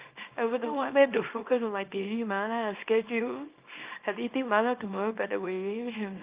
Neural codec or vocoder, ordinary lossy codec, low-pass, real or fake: codec, 24 kHz, 0.9 kbps, WavTokenizer, small release; Opus, 24 kbps; 3.6 kHz; fake